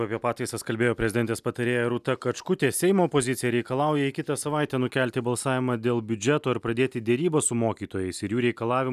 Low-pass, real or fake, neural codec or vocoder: 14.4 kHz; real; none